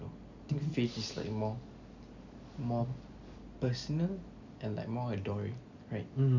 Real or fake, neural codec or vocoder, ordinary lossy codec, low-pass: real; none; none; 7.2 kHz